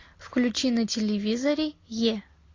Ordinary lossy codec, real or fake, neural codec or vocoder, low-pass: AAC, 32 kbps; real; none; 7.2 kHz